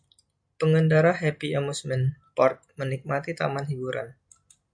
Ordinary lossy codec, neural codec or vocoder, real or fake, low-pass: MP3, 96 kbps; none; real; 9.9 kHz